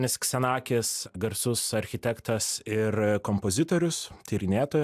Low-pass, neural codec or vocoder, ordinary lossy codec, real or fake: 14.4 kHz; none; AAC, 96 kbps; real